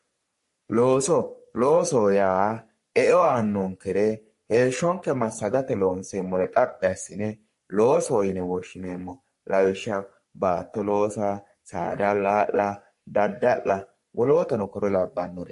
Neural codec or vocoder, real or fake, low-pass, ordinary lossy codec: codec, 44.1 kHz, 3.4 kbps, Pupu-Codec; fake; 14.4 kHz; MP3, 48 kbps